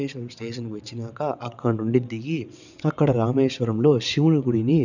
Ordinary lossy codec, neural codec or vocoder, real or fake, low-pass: none; vocoder, 22.05 kHz, 80 mel bands, WaveNeXt; fake; 7.2 kHz